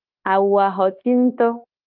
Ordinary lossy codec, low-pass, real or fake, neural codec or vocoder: Opus, 24 kbps; 5.4 kHz; fake; codec, 16 kHz, 0.9 kbps, LongCat-Audio-Codec